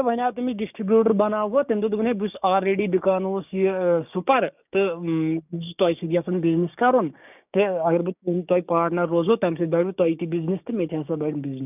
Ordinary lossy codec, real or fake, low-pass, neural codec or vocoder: none; fake; 3.6 kHz; codec, 16 kHz, 6 kbps, DAC